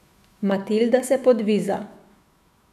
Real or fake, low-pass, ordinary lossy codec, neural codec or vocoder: fake; 14.4 kHz; none; autoencoder, 48 kHz, 128 numbers a frame, DAC-VAE, trained on Japanese speech